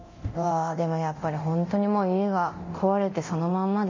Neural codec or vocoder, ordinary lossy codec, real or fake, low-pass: codec, 24 kHz, 0.9 kbps, DualCodec; MP3, 32 kbps; fake; 7.2 kHz